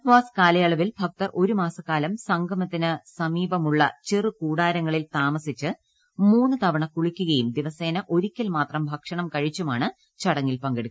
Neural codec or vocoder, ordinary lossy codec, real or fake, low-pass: none; none; real; none